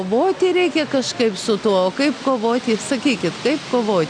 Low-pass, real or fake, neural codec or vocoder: 9.9 kHz; real; none